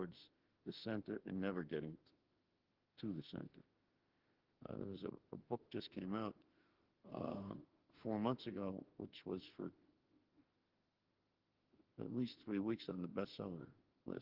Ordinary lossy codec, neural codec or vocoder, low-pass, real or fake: Opus, 16 kbps; autoencoder, 48 kHz, 32 numbers a frame, DAC-VAE, trained on Japanese speech; 5.4 kHz; fake